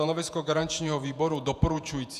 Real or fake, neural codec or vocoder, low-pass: fake; vocoder, 48 kHz, 128 mel bands, Vocos; 14.4 kHz